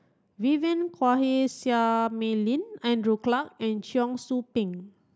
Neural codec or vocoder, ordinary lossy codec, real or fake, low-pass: none; none; real; none